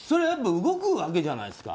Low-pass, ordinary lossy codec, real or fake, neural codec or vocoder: none; none; real; none